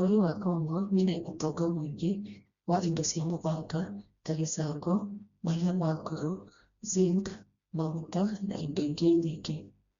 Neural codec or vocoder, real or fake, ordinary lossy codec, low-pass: codec, 16 kHz, 1 kbps, FreqCodec, smaller model; fake; Opus, 64 kbps; 7.2 kHz